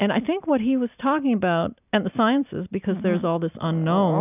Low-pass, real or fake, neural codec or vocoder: 3.6 kHz; real; none